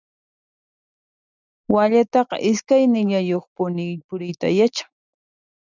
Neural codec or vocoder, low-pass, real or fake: none; 7.2 kHz; real